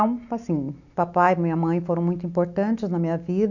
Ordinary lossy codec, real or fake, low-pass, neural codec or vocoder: none; real; 7.2 kHz; none